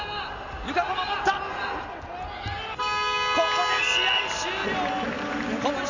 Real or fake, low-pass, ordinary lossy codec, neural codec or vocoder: real; 7.2 kHz; none; none